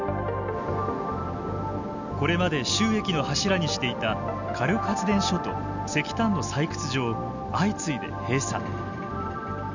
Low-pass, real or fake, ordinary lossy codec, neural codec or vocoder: 7.2 kHz; real; none; none